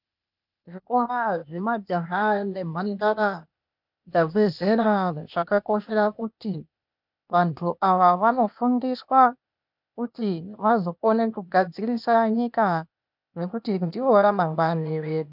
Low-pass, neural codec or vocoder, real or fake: 5.4 kHz; codec, 16 kHz, 0.8 kbps, ZipCodec; fake